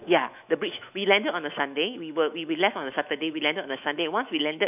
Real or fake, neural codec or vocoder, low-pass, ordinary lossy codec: fake; autoencoder, 48 kHz, 128 numbers a frame, DAC-VAE, trained on Japanese speech; 3.6 kHz; none